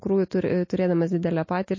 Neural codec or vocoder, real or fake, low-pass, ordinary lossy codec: none; real; 7.2 kHz; MP3, 32 kbps